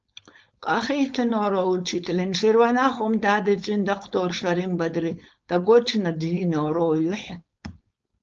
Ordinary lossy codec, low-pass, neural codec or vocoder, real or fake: Opus, 24 kbps; 7.2 kHz; codec, 16 kHz, 4.8 kbps, FACodec; fake